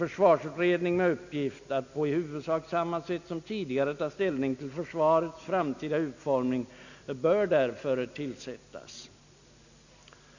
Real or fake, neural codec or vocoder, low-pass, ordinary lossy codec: real; none; 7.2 kHz; none